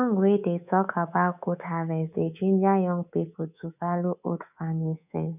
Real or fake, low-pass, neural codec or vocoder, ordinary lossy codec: fake; 3.6 kHz; codec, 24 kHz, 3.1 kbps, DualCodec; none